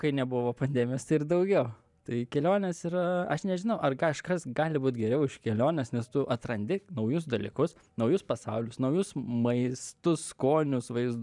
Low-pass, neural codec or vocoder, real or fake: 10.8 kHz; vocoder, 44.1 kHz, 128 mel bands every 512 samples, BigVGAN v2; fake